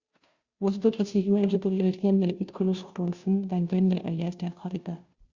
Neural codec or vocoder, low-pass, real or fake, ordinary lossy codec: codec, 16 kHz, 0.5 kbps, FunCodec, trained on Chinese and English, 25 frames a second; 7.2 kHz; fake; Opus, 64 kbps